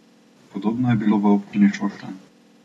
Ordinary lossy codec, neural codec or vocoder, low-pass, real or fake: AAC, 32 kbps; none; 19.8 kHz; real